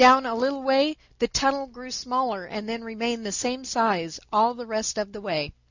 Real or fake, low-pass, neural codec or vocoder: real; 7.2 kHz; none